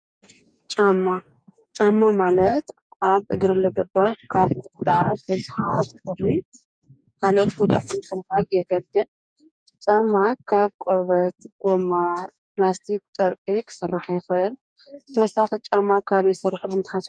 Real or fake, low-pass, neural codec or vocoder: fake; 9.9 kHz; codec, 44.1 kHz, 2.6 kbps, DAC